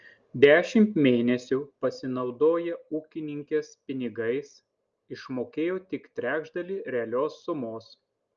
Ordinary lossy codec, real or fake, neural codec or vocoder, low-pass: Opus, 24 kbps; real; none; 7.2 kHz